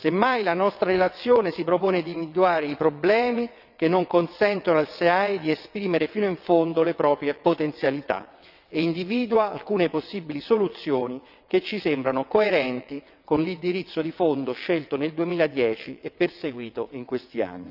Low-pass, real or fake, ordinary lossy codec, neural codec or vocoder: 5.4 kHz; fake; none; vocoder, 22.05 kHz, 80 mel bands, WaveNeXt